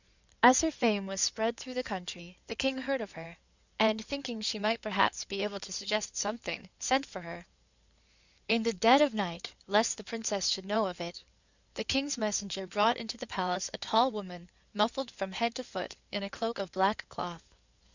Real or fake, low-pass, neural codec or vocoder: fake; 7.2 kHz; codec, 16 kHz in and 24 kHz out, 2.2 kbps, FireRedTTS-2 codec